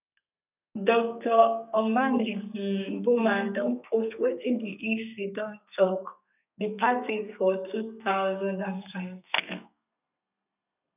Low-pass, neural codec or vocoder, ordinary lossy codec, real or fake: 3.6 kHz; codec, 32 kHz, 1.9 kbps, SNAC; none; fake